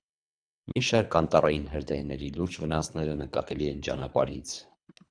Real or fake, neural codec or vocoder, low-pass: fake; codec, 24 kHz, 3 kbps, HILCodec; 9.9 kHz